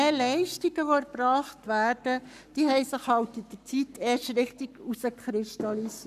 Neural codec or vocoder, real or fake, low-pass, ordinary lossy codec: codec, 44.1 kHz, 7.8 kbps, Pupu-Codec; fake; 14.4 kHz; none